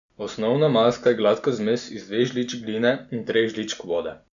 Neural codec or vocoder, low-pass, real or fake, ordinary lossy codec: none; 7.2 kHz; real; none